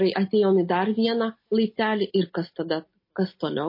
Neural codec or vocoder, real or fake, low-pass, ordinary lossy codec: none; real; 5.4 kHz; MP3, 24 kbps